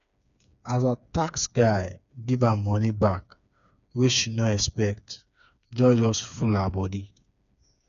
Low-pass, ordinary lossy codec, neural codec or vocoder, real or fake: 7.2 kHz; none; codec, 16 kHz, 4 kbps, FreqCodec, smaller model; fake